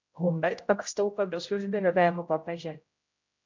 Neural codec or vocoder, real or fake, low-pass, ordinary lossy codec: codec, 16 kHz, 0.5 kbps, X-Codec, HuBERT features, trained on general audio; fake; 7.2 kHz; MP3, 48 kbps